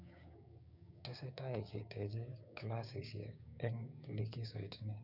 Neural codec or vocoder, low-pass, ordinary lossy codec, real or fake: codec, 16 kHz, 6 kbps, DAC; 5.4 kHz; none; fake